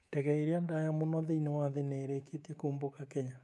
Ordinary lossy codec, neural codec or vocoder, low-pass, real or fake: none; codec, 24 kHz, 3.1 kbps, DualCodec; none; fake